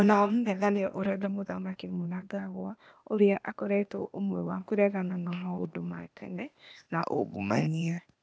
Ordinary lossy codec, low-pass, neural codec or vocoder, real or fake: none; none; codec, 16 kHz, 0.8 kbps, ZipCodec; fake